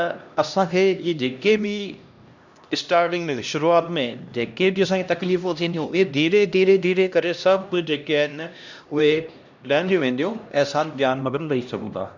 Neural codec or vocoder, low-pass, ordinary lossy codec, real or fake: codec, 16 kHz, 1 kbps, X-Codec, HuBERT features, trained on LibriSpeech; 7.2 kHz; none; fake